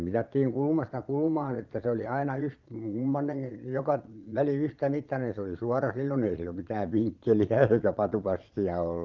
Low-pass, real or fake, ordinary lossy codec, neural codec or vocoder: 7.2 kHz; fake; Opus, 24 kbps; vocoder, 22.05 kHz, 80 mel bands, Vocos